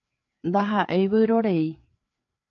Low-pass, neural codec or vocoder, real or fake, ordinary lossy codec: 7.2 kHz; codec, 16 kHz, 4 kbps, FreqCodec, larger model; fake; AAC, 48 kbps